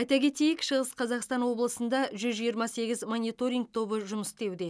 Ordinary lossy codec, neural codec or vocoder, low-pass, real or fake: none; none; none; real